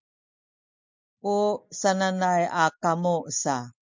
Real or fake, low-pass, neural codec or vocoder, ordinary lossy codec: real; 7.2 kHz; none; MP3, 64 kbps